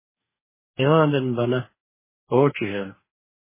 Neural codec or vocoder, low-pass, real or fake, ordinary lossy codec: codec, 44.1 kHz, 2.6 kbps, DAC; 3.6 kHz; fake; MP3, 16 kbps